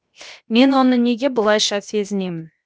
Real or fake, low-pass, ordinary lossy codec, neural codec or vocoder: fake; none; none; codec, 16 kHz, 0.7 kbps, FocalCodec